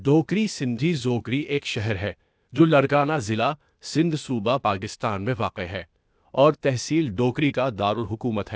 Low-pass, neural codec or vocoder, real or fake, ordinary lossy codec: none; codec, 16 kHz, 0.8 kbps, ZipCodec; fake; none